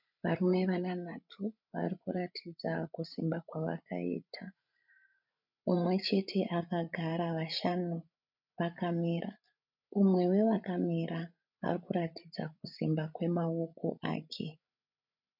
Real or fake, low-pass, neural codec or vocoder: fake; 5.4 kHz; codec, 16 kHz, 16 kbps, FreqCodec, larger model